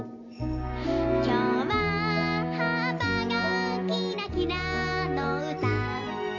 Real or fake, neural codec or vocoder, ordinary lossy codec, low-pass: real; none; none; 7.2 kHz